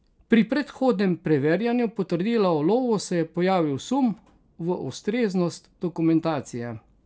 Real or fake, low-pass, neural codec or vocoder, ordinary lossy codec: real; none; none; none